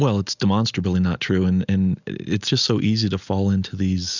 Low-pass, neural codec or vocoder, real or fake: 7.2 kHz; none; real